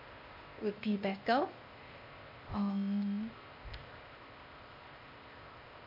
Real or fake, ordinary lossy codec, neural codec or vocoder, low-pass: fake; MP3, 32 kbps; codec, 16 kHz, 0.8 kbps, ZipCodec; 5.4 kHz